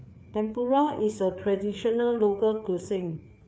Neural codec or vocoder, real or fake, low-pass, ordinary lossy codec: codec, 16 kHz, 4 kbps, FreqCodec, larger model; fake; none; none